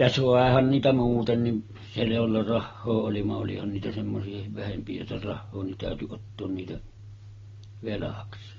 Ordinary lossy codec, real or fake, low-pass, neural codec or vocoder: AAC, 24 kbps; real; 7.2 kHz; none